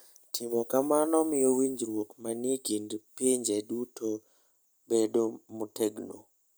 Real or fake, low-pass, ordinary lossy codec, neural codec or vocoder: real; none; none; none